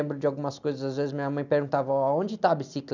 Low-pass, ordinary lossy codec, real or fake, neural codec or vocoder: 7.2 kHz; none; real; none